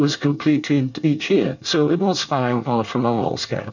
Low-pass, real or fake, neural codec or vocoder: 7.2 kHz; fake; codec, 24 kHz, 1 kbps, SNAC